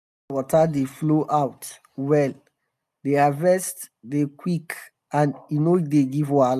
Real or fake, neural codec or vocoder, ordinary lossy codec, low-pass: real; none; none; 14.4 kHz